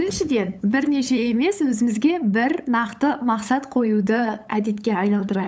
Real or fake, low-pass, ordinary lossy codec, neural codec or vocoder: fake; none; none; codec, 16 kHz, 8 kbps, FunCodec, trained on LibriTTS, 25 frames a second